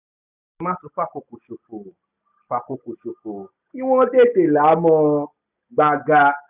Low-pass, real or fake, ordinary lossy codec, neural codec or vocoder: 3.6 kHz; real; none; none